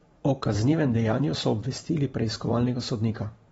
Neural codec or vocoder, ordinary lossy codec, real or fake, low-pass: vocoder, 44.1 kHz, 128 mel bands every 512 samples, BigVGAN v2; AAC, 24 kbps; fake; 19.8 kHz